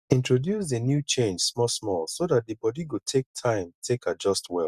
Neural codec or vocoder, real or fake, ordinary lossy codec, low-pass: none; real; Opus, 64 kbps; 14.4 kHz